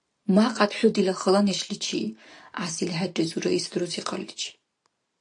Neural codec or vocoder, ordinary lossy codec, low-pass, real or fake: none; AAC, 48 kbps; 9.9 kHz; real